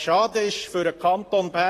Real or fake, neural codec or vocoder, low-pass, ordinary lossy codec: fake; vocoder, 44.1 kHz, 128 mel bands, Pupu-Vocoder; 14.4 kHz; AAC, 48 kbps